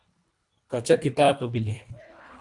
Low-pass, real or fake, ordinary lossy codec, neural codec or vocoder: 10.8 kHz; fake; AAC, 64 kbps; codec, 24 kHz, 1.5 kbps, HILCodec